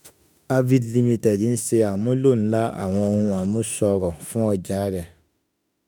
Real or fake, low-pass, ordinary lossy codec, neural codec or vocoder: fake; none; none; autoencoder, 48 kHz, 32 numbers a frame, DAC-VAE, trained on Japanese speech